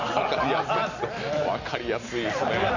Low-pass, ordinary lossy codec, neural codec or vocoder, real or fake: 7.2 kHz; none; none; real